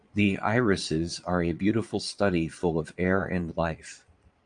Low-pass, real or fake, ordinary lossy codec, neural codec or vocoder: 9.9 kHz; fake; Opus, 32 kbps; vocoder, 22.05 kHz, 80 mel bands, Vocos